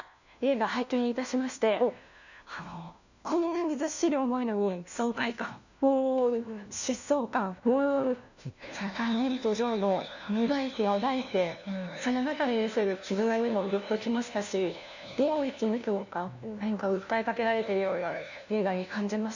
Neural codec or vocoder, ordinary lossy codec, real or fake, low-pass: codec, 16 kHz, 1 kbps, FunCodec, trained on LibriTTS, 50 frames a second; none; fake; 7.2 kHz